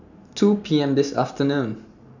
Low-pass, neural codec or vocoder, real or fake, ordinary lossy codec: 7.2 kHz; none; real; none